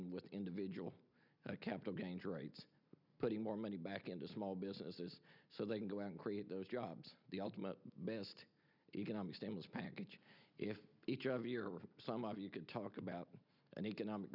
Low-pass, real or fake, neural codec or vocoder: 5.4 kHz; real; none